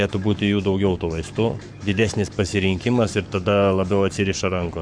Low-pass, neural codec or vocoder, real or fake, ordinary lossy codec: 9.9 kHz; codec, 44.1 kHz, 7.8 kbps, Pupu-Codec; fake; MP3, 96 kbps